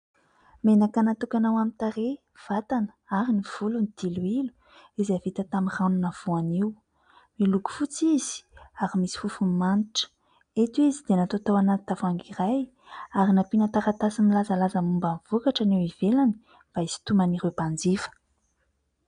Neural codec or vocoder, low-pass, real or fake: none; 9.9 kHz; real